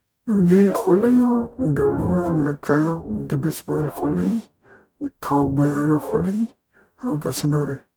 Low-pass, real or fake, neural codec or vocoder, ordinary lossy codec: none; fake; codec, 44.1 kHz, 0.9 kbps, DAC; none